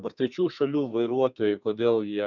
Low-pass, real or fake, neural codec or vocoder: 7.2 kHz; fake; codec, 32 kHz, 1.9 kbps, SNAC